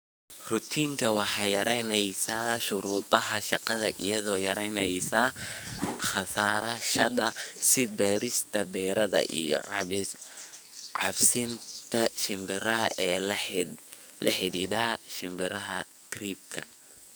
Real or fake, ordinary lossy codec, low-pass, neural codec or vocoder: fake; none; none; codec, 44.1 kHz, 2.6 kbps, SNAC